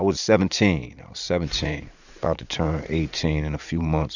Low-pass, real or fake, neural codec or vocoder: 7.2 kHz; fake; vocoder, 44.1 kHz, 80 mel bands, Vocos